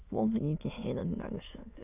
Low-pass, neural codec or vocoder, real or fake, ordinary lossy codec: 3.6 kHz; autoencoder, 22.05 kHz, a latent of 192 numbers a frame, VITS, trained on many speakers; fake; none